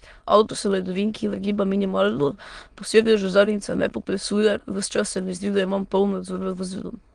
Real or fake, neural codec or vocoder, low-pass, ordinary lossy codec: fake; autoencoder, 22.05 kHz, a latent of 192 numbers a frame, VITS, trained on many speakers; 9.9 kHz; Opus, 24 kbps